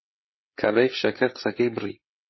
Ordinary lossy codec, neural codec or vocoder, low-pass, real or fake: MP3, 24 kbps; codec, 16 kHz, 16 kbps, FreqCodec, smaller model; 7.2 kHz; fake